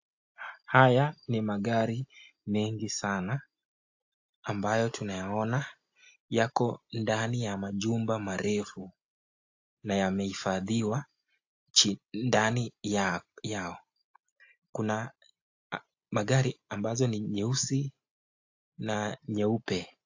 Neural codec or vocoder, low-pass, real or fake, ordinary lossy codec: none; 7.2 kHz; real; AAC, 48 kbps